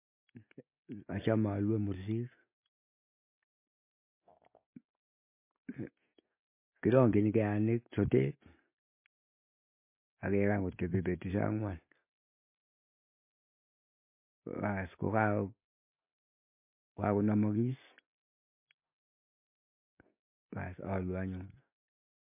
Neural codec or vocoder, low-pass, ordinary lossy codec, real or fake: none; 3.6 kHz; MP3, 24 kbps; real